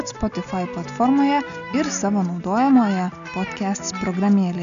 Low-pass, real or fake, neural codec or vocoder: 7.2 kHz; real; none